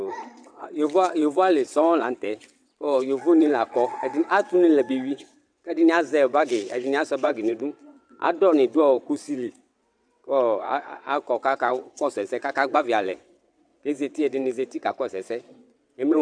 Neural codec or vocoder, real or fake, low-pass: vocoder, 22.05 kHz, 80 mel bands, WaveNeXt; fake; 9.9 kHz